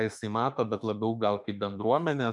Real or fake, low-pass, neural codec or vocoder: fake; 10.8 kHz; autoencoder, 48 kHz, 32 numbers a frame, DAC-VAE, trained on Japanese speech